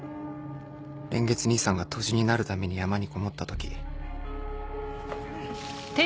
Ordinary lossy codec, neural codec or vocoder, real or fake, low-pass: none; none; real; none